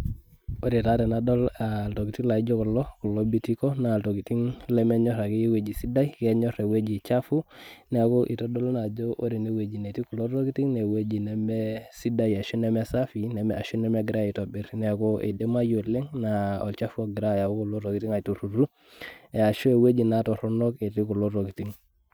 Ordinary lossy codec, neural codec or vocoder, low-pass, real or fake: none; none; none; real